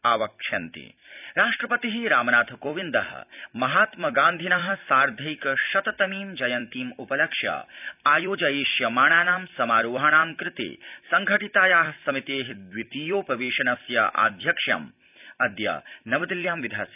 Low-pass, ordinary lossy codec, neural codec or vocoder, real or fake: 3.6 kHz; none; vocoder, 44.1 kHz, 128 mel bands every 256 samples, BigVGAN v2; fake